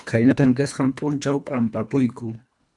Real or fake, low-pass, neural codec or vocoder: fake; 10.8 kHz; codec, 24 kHz, 1.5 kbps, HILCodec